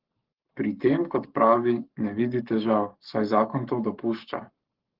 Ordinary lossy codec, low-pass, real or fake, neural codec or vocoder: Opus, 16 kbps; 5.4 kHz; fake; codec, 44.1 kHz, 7.8 kbps, Pupu-Codec